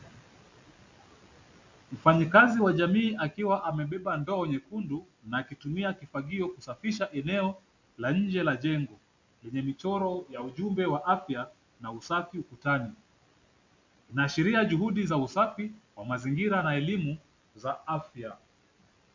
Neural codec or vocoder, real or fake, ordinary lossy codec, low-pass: none; real; MP3, 64 kbps; 7.2 kHz